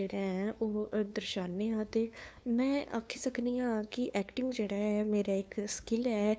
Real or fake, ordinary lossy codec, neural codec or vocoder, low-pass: fake; none; codec, 16 kHz, 2 kbps, FunCodec, trained on LibriTTS, 25 frames a second; none